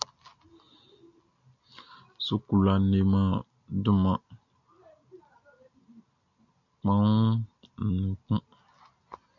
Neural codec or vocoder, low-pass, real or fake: none; 7.2 kHz; real